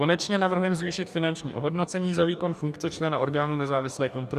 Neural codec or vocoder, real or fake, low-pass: codec, 44.1 kHz, 2.6 kbps, DAC; fake; 14.4 kHz